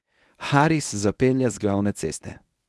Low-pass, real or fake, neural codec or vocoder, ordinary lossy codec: none; fake; codec, 24 kHz, 0.9 kbps, WavTokenizer, medium speech release version 1; none